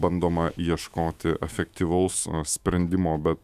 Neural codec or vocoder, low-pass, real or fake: autoencoder, 48 kHz, 128 numbers a frame, DAC-VAE, trained on Japanese speech; 14.4 kHz; fake